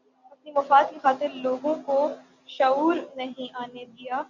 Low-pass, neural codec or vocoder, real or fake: 7.2 kHz; none; real